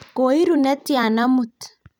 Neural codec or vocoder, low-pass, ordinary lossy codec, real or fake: vocoder, 44.1 kHz, 128 mel bands every 256 samples, BigVGAN v2; 19.8 kHz; none; fake